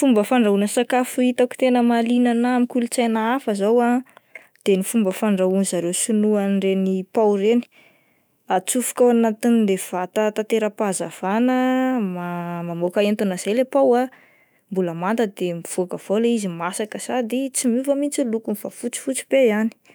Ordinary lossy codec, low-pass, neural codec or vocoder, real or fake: none; none; autoencoder, 48 kHz, 128 numbers a frame, DAC-VAE, trained on Japanese speech; fake